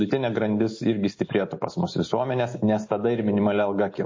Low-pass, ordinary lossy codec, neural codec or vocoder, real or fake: 7.2 kHz; MP3, 32 kbps; none; real